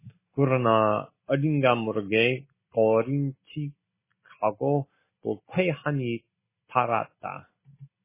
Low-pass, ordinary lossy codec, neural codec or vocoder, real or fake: 3.6 kHz; MP3, 16 kbps; none; real